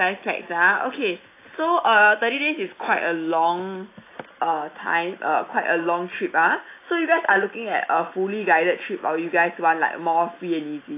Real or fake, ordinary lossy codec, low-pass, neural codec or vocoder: real; AAC, 24 kbps; 3.6 kHz; none